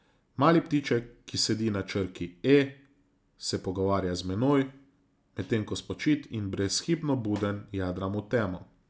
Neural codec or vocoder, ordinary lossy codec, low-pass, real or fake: none; none; none; real